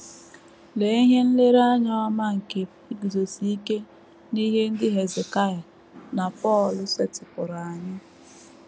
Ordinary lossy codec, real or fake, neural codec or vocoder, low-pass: none; real; none; none